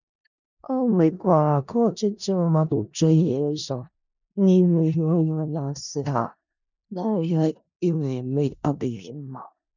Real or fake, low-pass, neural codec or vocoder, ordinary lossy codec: fake; 7.2 kHz; codec, 16 kHz in and 24 kHz out, 0.4 kbps, LongCat-Audio-Codec, four codebook decoder; none